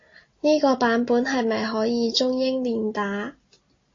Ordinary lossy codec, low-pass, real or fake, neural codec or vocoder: AAC, 32 kbps; 7.2 kHz; real; none